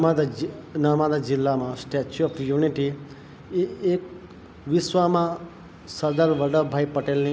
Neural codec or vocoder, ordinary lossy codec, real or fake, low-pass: none; none; real; none